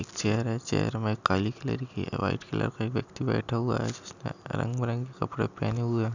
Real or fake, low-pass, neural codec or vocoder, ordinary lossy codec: real; 7.2 kHz; none; none